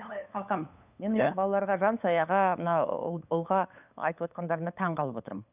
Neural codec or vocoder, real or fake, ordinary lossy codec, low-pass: codec, 16 kHz, 8 kbps, FunCodec, trained on LibriTTS, 25 frames a second; fake; none; 3.6 kHz